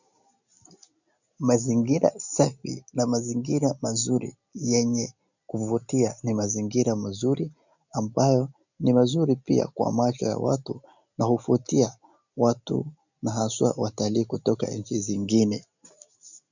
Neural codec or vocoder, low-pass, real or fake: none; 7.2 kHz; real